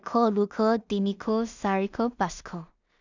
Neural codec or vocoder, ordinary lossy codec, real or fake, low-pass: codec, 16 kHz in and 24 kHz out, 0.4 kbps, LongCat-Audio-Codec, two codebook decoder; none; fake; 7.2 kHz